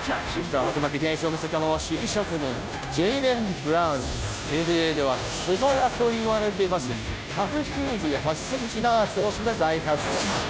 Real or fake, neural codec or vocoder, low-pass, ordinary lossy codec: fake; codec, 16 kHz, 0.5 kbps, FunCodec, trained on Chinese and English, 25 frames a second; none; none